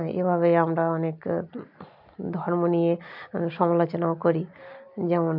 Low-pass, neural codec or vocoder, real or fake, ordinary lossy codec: 5.4 kHz; none; real; MP3, 48 kbps